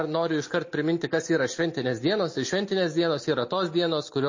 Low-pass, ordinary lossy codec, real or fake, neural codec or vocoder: 7.2 kHz; MP3, 32 kbps; real; none